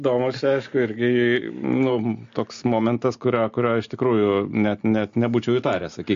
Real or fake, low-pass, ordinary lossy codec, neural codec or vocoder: real; 7.2 kHz; MP3, 48 kbps; none